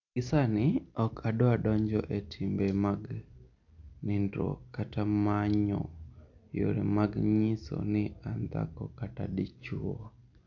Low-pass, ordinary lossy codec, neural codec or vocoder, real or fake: 7.2 kHz; none; none; real